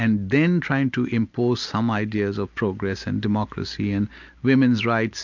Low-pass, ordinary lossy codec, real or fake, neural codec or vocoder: 7.2 kHz; MP3, 64 kbps; real; none